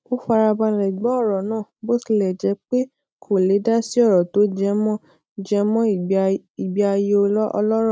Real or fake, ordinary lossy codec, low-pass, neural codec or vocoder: real; none; none; none